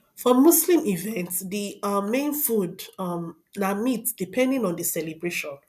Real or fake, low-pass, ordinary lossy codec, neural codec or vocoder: real; 14.4 kHz; none; none